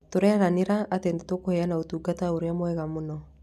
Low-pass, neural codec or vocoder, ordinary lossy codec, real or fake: 14.4 kHz; none; none; real